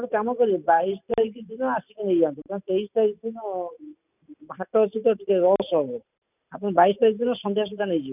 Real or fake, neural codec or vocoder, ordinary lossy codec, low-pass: real; none; none; 3.6 kHz